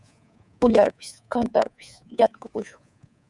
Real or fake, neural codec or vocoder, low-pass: fake; codec, 24 kHz, 3.1 kbps, DualCodec; 10.8 kHz